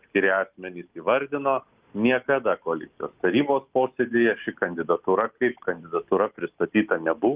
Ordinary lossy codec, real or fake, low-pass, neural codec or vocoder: Opus, 32 kbps; real; 3.6 kHz; none